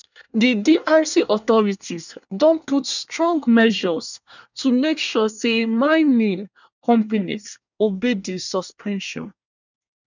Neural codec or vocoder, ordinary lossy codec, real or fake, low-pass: codec, 24 kHz, 1 kbps, SNAC; none; fake; 7.2 kHz